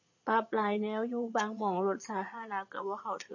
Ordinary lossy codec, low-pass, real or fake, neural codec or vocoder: MP3, 32 kbps; 7.2 kHz; fake; vocoder, 22.05 kHz, 80 mel bands, Vocos